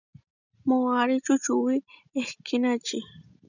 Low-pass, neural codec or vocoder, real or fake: 7.2 kHz; none; real